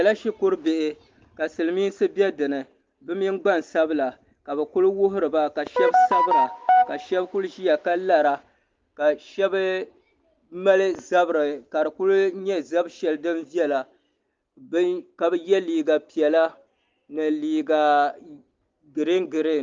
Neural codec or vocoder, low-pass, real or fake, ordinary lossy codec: none; 7.2 kHz; real; Opus, 32 kbps